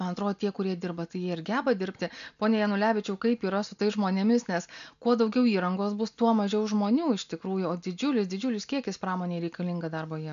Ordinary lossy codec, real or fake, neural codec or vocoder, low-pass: MP3, 96 kbps; real; none; 7.2 kHz